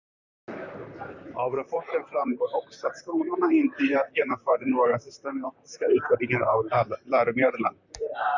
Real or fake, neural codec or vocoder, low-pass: fake; vocoder, 44.1 kHz, 128 mel bands, Pupu-Vocoder; 7.2 kHz